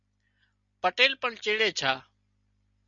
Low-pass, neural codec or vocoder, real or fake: 7.2 kHz; none; real